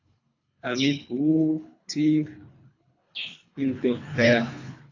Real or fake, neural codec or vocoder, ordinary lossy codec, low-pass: fake; codec, 24 kHz, 3 kbps, HILCodec; AAC, 48 kbps; 7.2 kHz